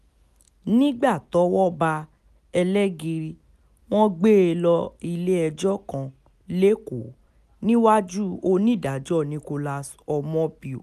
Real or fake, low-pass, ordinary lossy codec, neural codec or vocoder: real; 14.4 kHz; none; none